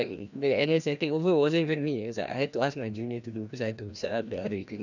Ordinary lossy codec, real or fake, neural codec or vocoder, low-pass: none; fake; codec, 16 kHz, 1 kbps, FreqCodec, larger model; 7.2 kHz